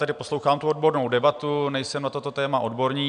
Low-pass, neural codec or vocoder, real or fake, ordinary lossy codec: 9.9 kHz; none; real; MP3, 96 kbps